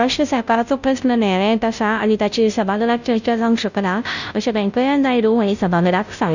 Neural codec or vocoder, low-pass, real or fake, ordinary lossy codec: codec, 16 kHz, 0.5 kbps, FunCodec, trained on Chinese and English, 25 frames a second; 7.2 kHz; fake; none